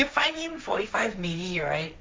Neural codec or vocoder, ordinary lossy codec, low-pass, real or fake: codec, 16 kHz, 1.1 kbps, Voila-Tokenizer; none; none; fake